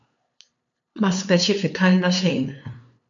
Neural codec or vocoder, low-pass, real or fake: codec, 16 kHz, 2 kbps, FunCodec, trained on LibriTTS, 25 frames a second; 7.2 kHz; fake